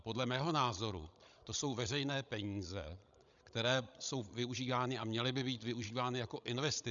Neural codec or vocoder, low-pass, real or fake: codec, 16 kHz, 16 kbps, FreqCodec, larger model; 7.2 kHz; fake